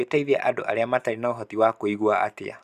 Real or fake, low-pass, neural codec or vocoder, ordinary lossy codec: real; 14.4 kHz; none; Opus, 64 kbps